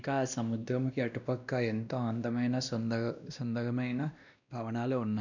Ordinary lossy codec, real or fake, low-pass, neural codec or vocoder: none; fake; 7.2 kHz; codec, 16 kHz, 1 kbps, X-Codec, WavLM features, trained on Multilingual LibriSpeech